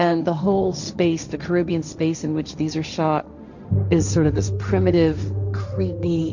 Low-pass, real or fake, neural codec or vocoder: 7.2 kHz; fake; codec, 16 kHz, 1.1 kbps, Voila-Tokenizer